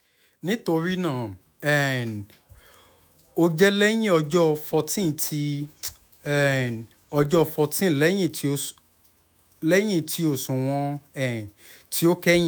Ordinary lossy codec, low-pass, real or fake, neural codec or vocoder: none; none; fake; autoencoder, 48 kHz, 128 numbers a frame, DAC-VAE, trained on Japanese speech